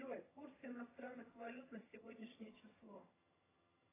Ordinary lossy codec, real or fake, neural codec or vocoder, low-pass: AAC, 16 kbps; fake; vocoder, 22.05 kHz, 80 mel bands, HiFi-GAN; 3.6 kHz